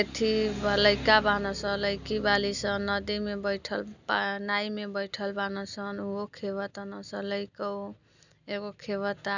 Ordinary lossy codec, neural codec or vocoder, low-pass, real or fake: none; none; 7.2 kHz; real